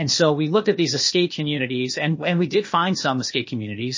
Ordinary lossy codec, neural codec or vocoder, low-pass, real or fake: MP3, 32 kbps; codec, 16 kHz, 0.8 kbps, ZipCodec; 7.2 kHz; fake